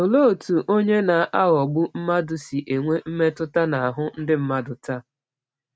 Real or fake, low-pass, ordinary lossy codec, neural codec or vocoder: real; none; none; none